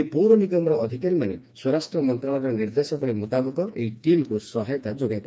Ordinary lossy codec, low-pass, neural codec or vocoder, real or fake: none; none; codec, 16 kHz, 2 kbps, FreqCodec, smaller model; fake